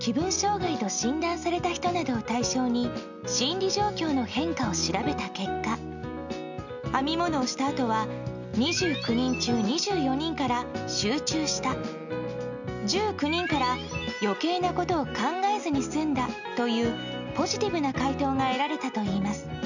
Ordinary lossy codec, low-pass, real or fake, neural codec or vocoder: none; 7.2 kHz; real; none